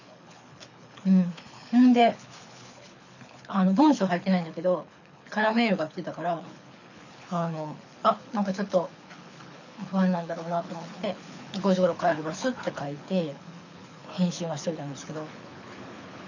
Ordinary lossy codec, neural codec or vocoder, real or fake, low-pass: none; codec, 24 kHz, 6 kbps, HILCodec; fake; 7.2 kHz